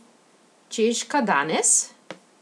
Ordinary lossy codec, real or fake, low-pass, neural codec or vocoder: none; real; none; none